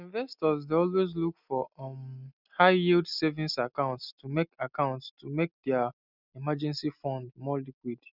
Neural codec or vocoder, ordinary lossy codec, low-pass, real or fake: none; none; 5.4 kHz; real